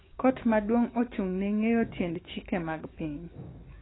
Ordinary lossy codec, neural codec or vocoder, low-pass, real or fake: AAC, 16 kbps; autoencoder, 48 kHz, 128 numbers a frame, DAC-VAE, trained on Japanese speech; 7.2 kHz; fake